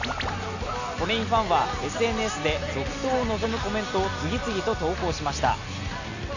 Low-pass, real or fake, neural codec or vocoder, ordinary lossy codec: 7.2 kHz; real; none; none